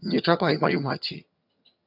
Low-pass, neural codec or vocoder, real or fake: 5.4 kHz; vocoder, 22.05 kHz, 80 mel bands, HiFi-GAN; fake